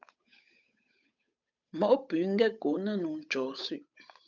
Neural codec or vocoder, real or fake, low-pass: codec, 16 kHz, 8 kbps, FunCodec, trained on Chinese and English, 25 frames a second; fake; 7.2 kHz